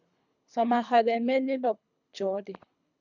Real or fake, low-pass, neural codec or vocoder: fake; 7.2 kHz; codec, 24 kHz, 3 kbps, HILCodec